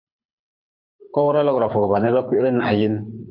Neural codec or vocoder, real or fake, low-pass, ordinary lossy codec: codec, 24 kHz, 6 kbps, HILCodec; fake; 5.4 kHz; MP3, 48 kbps